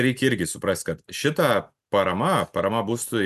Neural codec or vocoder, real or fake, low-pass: none; real; 14.4 kHz